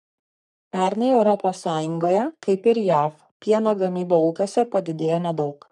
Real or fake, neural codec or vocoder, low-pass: fake; codec, 44.1 kHz, 3.4 kbps, Pupu-Codec; 10.8 kHz